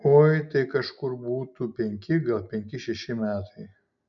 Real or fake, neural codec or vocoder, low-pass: real; none; 7.2 kHz